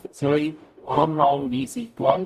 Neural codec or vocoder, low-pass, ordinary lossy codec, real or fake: codec, 44.1 kHz, 0.9 kbps, DAC; 14.4 kHz; Opus, 64 kbps; fake